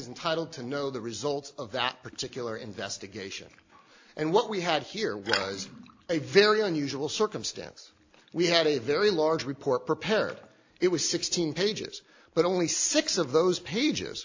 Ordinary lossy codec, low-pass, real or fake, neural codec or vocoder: AAC, 48 kbps; 7.2 kHz; real; none